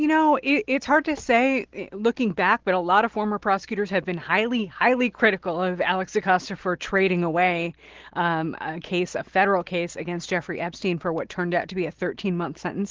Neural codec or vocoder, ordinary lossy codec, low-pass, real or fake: none; Opus, 16 kbps; 7.2 kHz; real